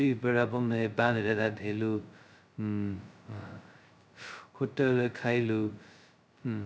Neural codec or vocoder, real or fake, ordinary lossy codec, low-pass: codec, 16 kHz, 0.2 kbps, FocalCodec; fake; none; none